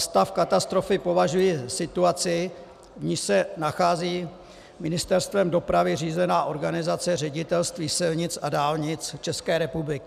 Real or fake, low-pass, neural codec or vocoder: real; 14.4 kHz; none